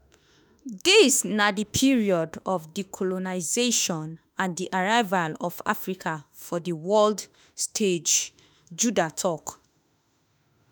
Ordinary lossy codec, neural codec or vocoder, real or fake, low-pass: none; autoencoder, 48 kHz, 32 numbers a frame, DAC-VAE, trained on Japanese speech; fake; none